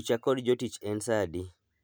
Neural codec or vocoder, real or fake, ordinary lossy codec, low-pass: none; real; none; none